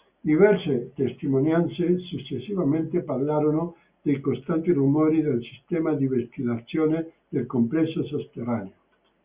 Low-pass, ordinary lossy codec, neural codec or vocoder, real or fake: 3.6 kHz; Opus, 64 kbps; none; real